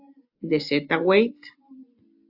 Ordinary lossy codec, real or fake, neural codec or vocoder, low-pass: AAC, 48 kbps; real; none; 5.4 kHz